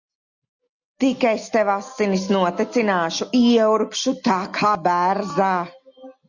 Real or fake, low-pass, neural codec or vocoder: real; 7.2 kHz; none